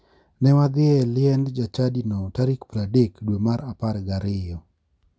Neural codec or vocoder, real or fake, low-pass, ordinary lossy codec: none; real; none; none